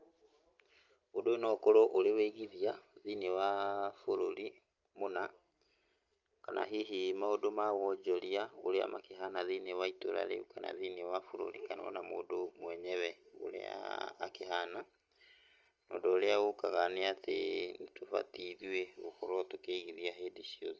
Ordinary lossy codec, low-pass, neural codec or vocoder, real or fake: Opus, 24 kbps; 7.2 kHz; none; real